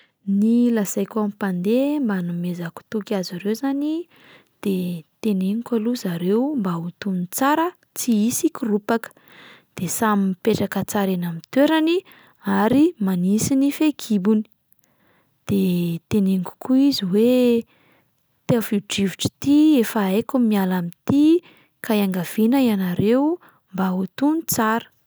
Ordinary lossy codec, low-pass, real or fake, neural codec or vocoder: none; none; real; none